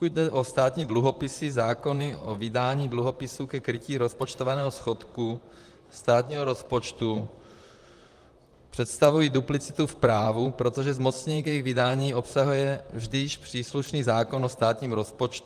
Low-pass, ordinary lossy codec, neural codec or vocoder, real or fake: 14.4 kHz; Opus, 32 kbps; vocoder, 44.1 kHz, 128 mel bands, Pupu-Vocoder; fake